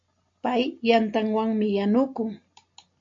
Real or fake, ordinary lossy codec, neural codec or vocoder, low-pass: real; MP3, 48 kbps; none; 7.2 kHz